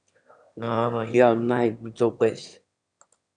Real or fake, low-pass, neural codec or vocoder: fake; 9.9 kHz; autoencoder, 22.05 kHz, a latent of 192 numbers a frame, VITS, trained on one speaker